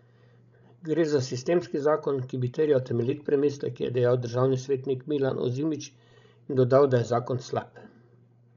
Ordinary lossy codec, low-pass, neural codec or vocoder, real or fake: none; 7.2 kHz; codec, 16 kHz, 16 kbps, FreqCodec, larger model; fake